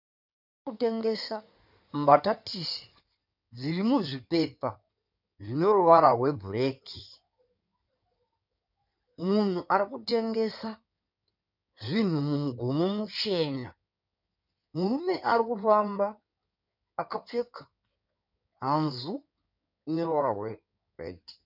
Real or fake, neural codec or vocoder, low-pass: fake; codec, 16 kHz in and 24 kHz out, 2.2 kbps, FireRedTTS-2 codec; 5.4 kHz